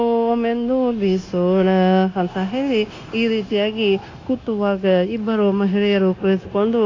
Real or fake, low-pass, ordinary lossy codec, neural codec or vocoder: fake; 7.2 kHz; AAC, 32 kbps; codec, 16 kHz, 0.9 kbps, LongCat-Audio-Codec